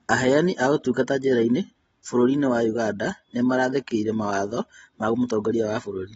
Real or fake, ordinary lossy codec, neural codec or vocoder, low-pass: real; AAC, 24 kbps; none; 9.9 kHz